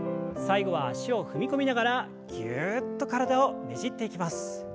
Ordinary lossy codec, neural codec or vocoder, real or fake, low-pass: none; none; real; none